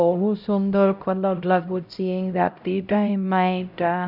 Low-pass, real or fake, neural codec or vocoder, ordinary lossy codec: 5.4 kHz; fake; codec, 16 kHz, 0.5 kbps, X-Codec, HuBERT features, trained on LibriSpeech; none